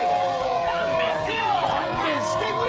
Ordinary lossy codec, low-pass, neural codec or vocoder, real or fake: none; none; codec, 16 kHz, 16 kbps, FreqCodec, smaller model; fake